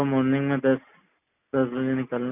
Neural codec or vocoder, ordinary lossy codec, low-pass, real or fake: none; none; 3.6 kHz; real